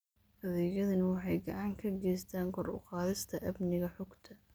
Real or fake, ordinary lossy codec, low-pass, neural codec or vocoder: real; none; none; none